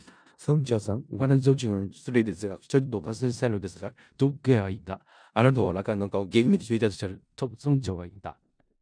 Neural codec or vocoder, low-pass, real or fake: codec, 16 kHz in and 24 kHz out, 0.4 kbps, LongCat-Audio-Codec, four codebook decoder; 9.9 kHz; fake